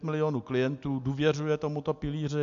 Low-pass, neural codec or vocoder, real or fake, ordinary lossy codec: 7.2 kHz; none; real; AAC, 64 kbps